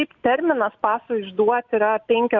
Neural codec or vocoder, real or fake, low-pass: none; real; 7.2 kHz